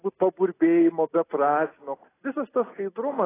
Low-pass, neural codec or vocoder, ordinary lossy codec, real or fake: 3.6 kHz; none; AAC, 16 kbps; real